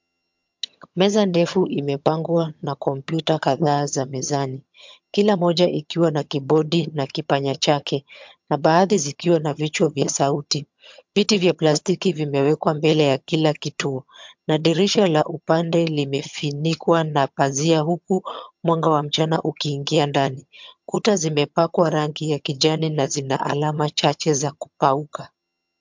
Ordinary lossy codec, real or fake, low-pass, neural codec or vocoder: MP3, 64 kbps; fake; 7.2 kHz; vocoder, 22.05 kHz, 80 mel bands, HiFi-GAN